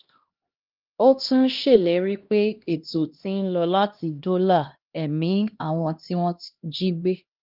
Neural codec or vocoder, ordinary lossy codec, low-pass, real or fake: codec, 16 kHz, 1 kbps, X-Codec, HuBERT features, trained on LibriSpeech; Opus, 32 kbps; 5.4 kHz; fake